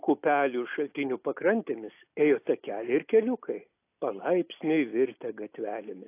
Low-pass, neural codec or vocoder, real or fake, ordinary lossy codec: 3.6 kHz; none; real; AAC, 24 kbps